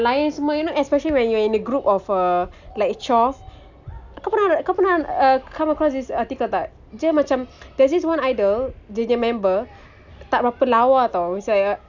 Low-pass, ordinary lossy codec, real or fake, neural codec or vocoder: 7.2 kHz; none; real; none